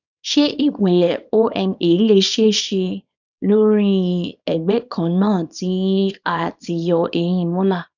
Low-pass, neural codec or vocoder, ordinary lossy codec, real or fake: 7.2 kHz; codec, 24 kHz, 0.9 kbps, WavTokenizer, small release; none; fake